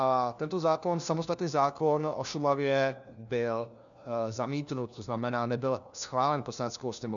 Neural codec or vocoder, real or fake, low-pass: codec, 16 kHz, 1 kbps, FunCodec, trained on LibriTTS, 50 frames a second; fake; 7.2 kHz